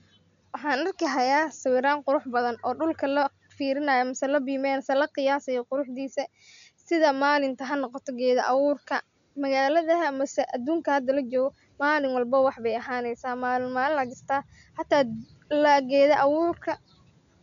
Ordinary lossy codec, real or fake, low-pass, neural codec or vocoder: none; real; 7.2 kHz; none